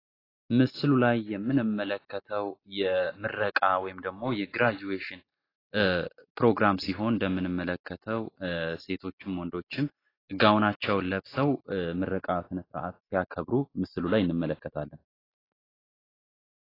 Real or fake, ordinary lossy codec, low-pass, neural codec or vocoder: real; AAC, 24 kbps; 5.4 kHz; none